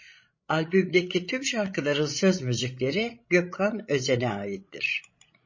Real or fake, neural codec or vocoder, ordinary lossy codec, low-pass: fake; codec, 16 kHz, 16 kbps, FreqCodec, larger model; MP3, 32 kbps; 7.2 kHz